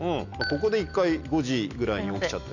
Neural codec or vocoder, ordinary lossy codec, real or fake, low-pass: none; none; real; 7.2 kHz